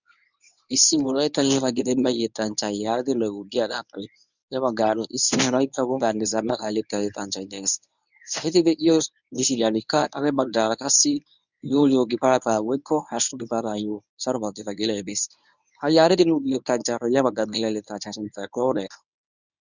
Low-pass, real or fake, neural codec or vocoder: 7.2 kHz; fake; codec, 24 kHz, 0.9 kbps, WavTokenizer, medium speech release version 1